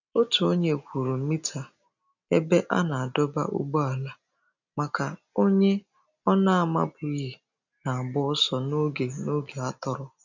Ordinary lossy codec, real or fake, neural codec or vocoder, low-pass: none; real; none; 7.2 kHz